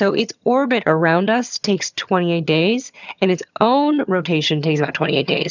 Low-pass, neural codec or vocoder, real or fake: 7.2 kHz; vocoder, 22.05 kHz, 80 mel bands, HiFi-GAN; fake